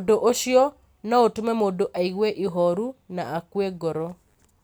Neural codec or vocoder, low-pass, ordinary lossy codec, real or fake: none; none; none; real